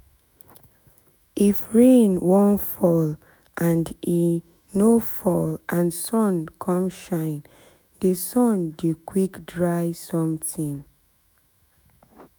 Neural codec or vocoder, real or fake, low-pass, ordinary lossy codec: autoencoder, 48 kHz, 128 numbers a frame, DAC-VAE, trained on Japanese speech; fake; none; none